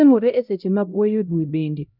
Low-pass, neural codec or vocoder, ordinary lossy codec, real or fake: 5.4 kHz; codec, 16 kHz, 0.5 kbps, X-Codec, HuBERT features, trained on LibriSpeech; none; fake